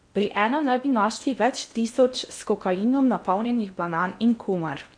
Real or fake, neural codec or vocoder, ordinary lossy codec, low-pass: fake; codec, 16 kHz in and 24 kHz out, 0.8 kbps, FocalCodec, streaming, 65536 codes; MP3, 64 kbps; 9.9 kHz